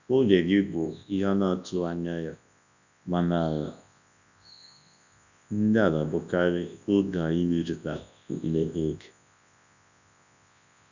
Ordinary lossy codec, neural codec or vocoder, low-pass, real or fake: none; codec, 24 kHz, 0.9 kbps, WavTokenizer, large speech release; 7.2 kHz; fake